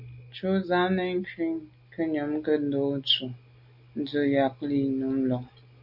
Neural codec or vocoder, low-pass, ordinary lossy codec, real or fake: none; 5.4 kHz; MP3, 48 kbps; real